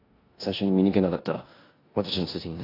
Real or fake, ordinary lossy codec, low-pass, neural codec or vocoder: fake; AAC, 24 kbps; 5.4 kHz; codec, 16 kHz in and 24 kHz out, 0.9 kbps, LongCat-Audio-Codec, four codebook decoder